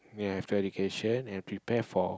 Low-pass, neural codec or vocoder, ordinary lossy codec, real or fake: none; none; none; real